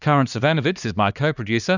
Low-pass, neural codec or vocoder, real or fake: 7.2 kHz; codec, 16 kHz, 2 kbps, X-Codec, HuBERT features, trained on LibriSpeech; fake